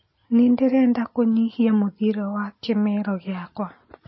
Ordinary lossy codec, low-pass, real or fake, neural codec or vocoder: MP3, 24 kbps; 7.2 kHz; real; none